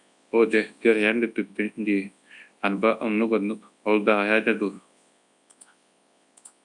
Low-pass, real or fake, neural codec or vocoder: 10.8 kHz; fake; codec, 24 kHz, 0.9 kbps, WavTokenizer, large speech release